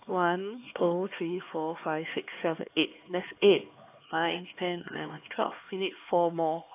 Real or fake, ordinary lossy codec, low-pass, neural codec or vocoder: fake; AAC, 24 kbps; 3.6 kHz; codec, 16 kHz, 4 kbps, X-Codec, HuBERT features, trained on LibriSpeech